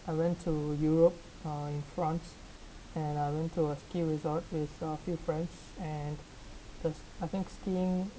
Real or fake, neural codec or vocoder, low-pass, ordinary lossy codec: real; none; none; none